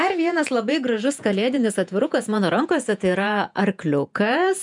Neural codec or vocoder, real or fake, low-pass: vocoder, 48 kHz, 128 mel bands, Vocos; fake; 10.8 kHz